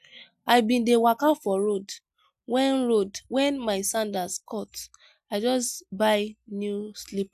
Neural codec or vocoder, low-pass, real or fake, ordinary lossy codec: none; 14.4 kHz; real; none